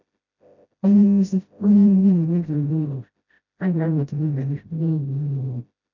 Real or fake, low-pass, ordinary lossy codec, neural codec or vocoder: fake; 7.2 kHz; none; codec, 16 kHz, 0.5 kbps, FreqCodec, smaller model